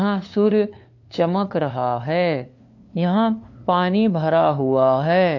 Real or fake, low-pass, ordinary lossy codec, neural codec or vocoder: fake; 7.2 kHz; AAC, 48 kbps; codec, 16 kHz, 2 kbps, FunCodec, trained on LibriTTS, 25 frames a second